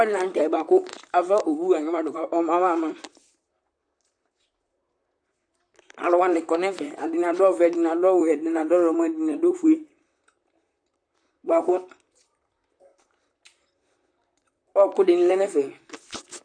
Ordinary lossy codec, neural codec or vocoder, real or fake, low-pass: AAC, 64 kbps; vocoder, 44.1 kHz, 128 mel bands, Pupu-Vocoder; fake; 9.9 kHz